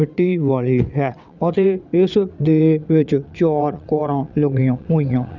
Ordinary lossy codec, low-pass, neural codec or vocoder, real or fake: none; 7.2 kHz; vocoder, 22.05 kHz, 80 mel bands, WaveNeXt; fake